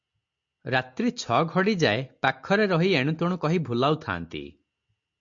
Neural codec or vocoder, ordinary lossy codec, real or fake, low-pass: none; MP3, 48 kbps; real; 7.2 kHz